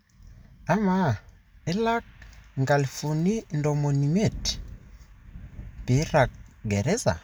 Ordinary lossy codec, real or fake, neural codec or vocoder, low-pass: none; real; none; none